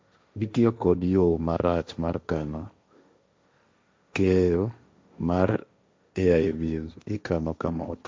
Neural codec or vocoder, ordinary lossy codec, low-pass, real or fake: codec, 16 kHz, 1.1 kbps, Voila-Tokenizer; none; none; fake